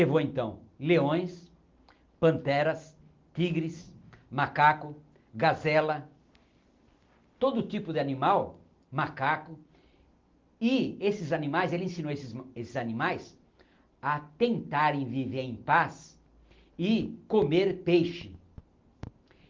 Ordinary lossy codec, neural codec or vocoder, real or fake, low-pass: Opus, 32 kbps; none; real; 7.2 kHz